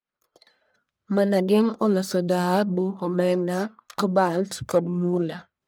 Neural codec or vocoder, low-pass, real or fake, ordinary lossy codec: codec, 44.1 kHz, 1.7 kbps, Pupu-Codec; none; fake; none